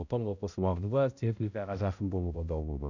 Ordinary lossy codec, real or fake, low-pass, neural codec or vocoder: none; fake; 7.2 kHz; codec, 16 kHz, 0.5 kbps, X-Codec, HuBERT features, trained on balanced general audio